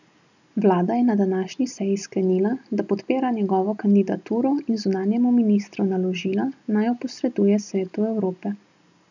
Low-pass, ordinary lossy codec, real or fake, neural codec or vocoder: 7.2 kHz; none; real; none